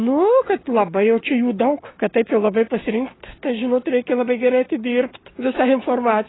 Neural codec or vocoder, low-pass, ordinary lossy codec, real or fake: codec, 16 kHz, 6 kbps, DAC; 7.2 kHz; AAC, 16 kbps; fake